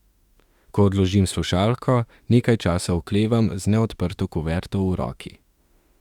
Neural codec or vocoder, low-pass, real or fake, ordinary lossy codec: autoencoder, 48 kHz, 32 numbers a frame, DAC-VAE, trained on Japanese speech; 19.8 kHz; fake; Opus, 64 kbps